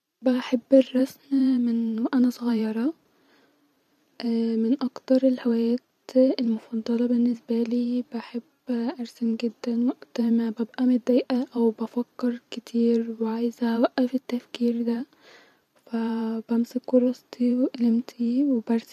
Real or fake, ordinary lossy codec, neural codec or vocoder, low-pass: fake; none; vocoder, 44.1 kHz, 128 mel bands every 512 samples, BigVGAN v2; 14.4 kHz